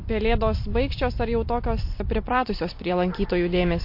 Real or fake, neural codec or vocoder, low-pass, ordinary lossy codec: fake; vocoder, 44.1 kHz, 128 mel bands every 256 samples, BigVGAN v2; 5.4 kHz; MP3, 32 kbps